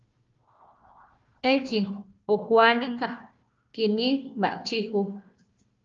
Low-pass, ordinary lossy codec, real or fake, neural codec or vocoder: 7.2 kHz; Opus, 24 kbps; fake; codec, 16 kHz, 1 kbps, FunCodec, trained on Chinese and English, 50 frames a second